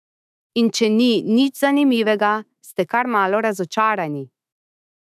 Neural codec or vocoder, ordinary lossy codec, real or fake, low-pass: autoencoder, 48 kHz, 128 numbers a frame, DAC-VAE, trained on Japanese speech; none; fake; 14.4 kHz